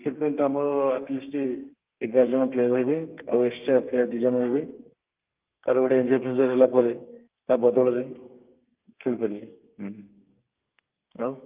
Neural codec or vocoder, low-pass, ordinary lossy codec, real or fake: codec, 32 kHz, 1.9 kbps, SNAC; 3.6 kHz; Opus, 32 kbps; fake